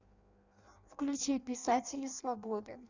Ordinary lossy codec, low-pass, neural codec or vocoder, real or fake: Opus, 64 kbps; 7.2 kHz; codec, 16 kHz in and 24 kHz out, 0.6 kbps, FireRedTTS-2 codec; fake